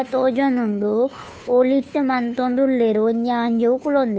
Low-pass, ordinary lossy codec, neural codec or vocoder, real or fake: none; none; codec, 16 kHz, 2 kbps, FunCodec, trained on Chinese and English, 25 frames a second; fake